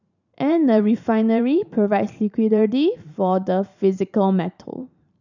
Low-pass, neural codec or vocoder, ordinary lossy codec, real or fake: 7.2 kHz; vocoder, 44.1 kHz, 128 mel bands every 512 samples, BigVGAN v2; none; fake